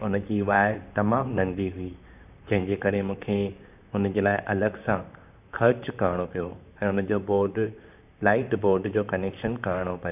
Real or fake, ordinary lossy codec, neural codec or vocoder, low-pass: fake; none; vocoder, 44.1 kHz, 128 mel bands, Pupu-Vocoder; 3.6 kHz